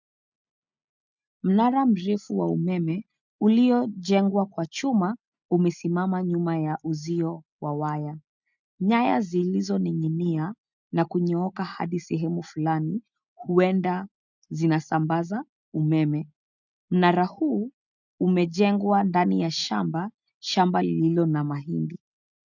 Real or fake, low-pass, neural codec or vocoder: real; 7.2 kHz; none